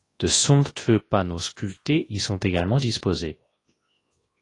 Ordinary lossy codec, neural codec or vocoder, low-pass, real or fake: AAC, 32 kbps; codec, 24 kHz, 0.9 kbps, WavTokenizer, large speech release; 10.8 kHz; fake